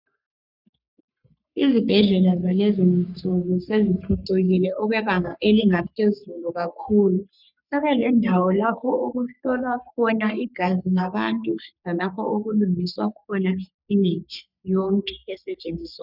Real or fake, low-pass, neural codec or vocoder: fake; 5.4 kHz; codec, 44.1 kHz, 3.4 kbps, Pupu-Codec